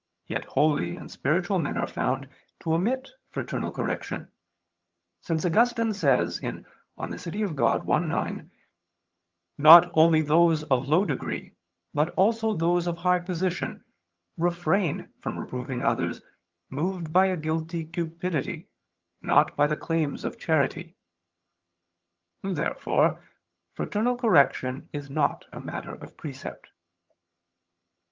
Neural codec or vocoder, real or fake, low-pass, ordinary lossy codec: vocoder, 22.05 kHz, 80 mel bands, HiFi-GAN; fake; 7.2 kHz; Opus, 24 kbps